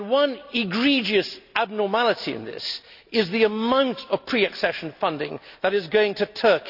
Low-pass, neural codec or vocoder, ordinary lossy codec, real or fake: 5.4 kHz; none; none; real